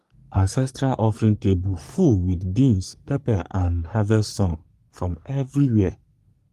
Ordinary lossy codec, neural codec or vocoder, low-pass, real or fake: Opus, 32 kbps; codec, 44.1 kHz, 3.4 kbps, Pupu-Codec; 14.4 kHz; fake